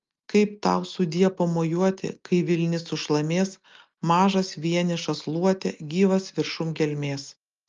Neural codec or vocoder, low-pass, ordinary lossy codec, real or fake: none; 7.2 kHz; Opus, 32 kbps; real